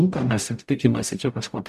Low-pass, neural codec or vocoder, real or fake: 14.4 kHz; codec, 44.1 kHz, 0.9 kbps, DAC; fake